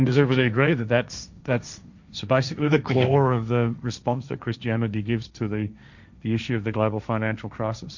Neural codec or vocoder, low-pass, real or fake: codec, 16 kHz, 1.1 kbps, Voila-Tokenizer; 7.2 kHz; fake